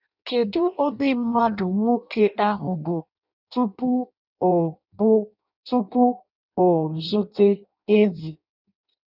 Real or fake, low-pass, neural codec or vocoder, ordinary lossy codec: fake; 5.4 kHz; codec, 16 kHz in and 24 kHz out, 0.6 kbps, FireRedTTS-2 codec; none